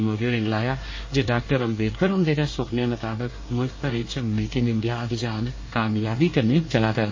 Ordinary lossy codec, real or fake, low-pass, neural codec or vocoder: MP3, 32 kbps; fake; 7.2 kHz; codec, 24 kHz, 1 kbps, SNAC